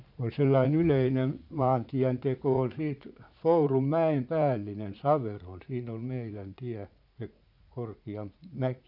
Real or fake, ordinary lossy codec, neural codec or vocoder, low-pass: fake; none; vocoder, 44.1 kHz, 80 mel bands, Vocos; 5.4 kHz